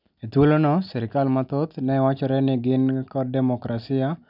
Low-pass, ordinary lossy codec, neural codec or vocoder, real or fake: 5.4 kHz; none; none; real